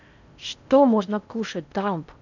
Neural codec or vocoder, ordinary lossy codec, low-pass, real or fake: codec, 16 kHz in and 24 kHz out, 0.6 kbps, FocalCodec, streaming, 4096 codes; none; 7.2 kHz; fake